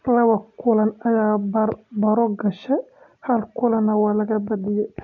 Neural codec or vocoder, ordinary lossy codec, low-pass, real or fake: none; none; 7.2 kHz; real